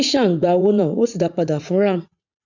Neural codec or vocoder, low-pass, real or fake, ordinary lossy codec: vocoder, 44.1 kHz, 80 mel bands, Vocos; 7.2 kHz; fake; none